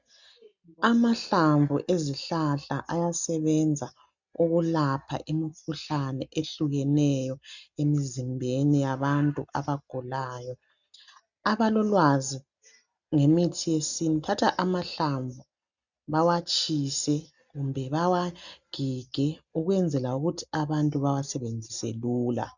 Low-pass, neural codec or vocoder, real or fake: 7.2 kHz; none; real